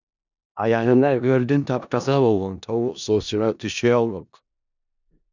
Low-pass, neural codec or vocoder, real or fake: 7.2 kHz; codec, 16 kHz in and 24 kHz out, 0.4 kbps, LongCat-Audio-Codec, four codebook decoder; fake